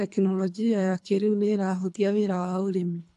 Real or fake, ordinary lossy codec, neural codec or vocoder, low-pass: fake; AAC, 64 kbps; codec, 24 kHz, 3 kbps, HILCodec; 10.8 kHz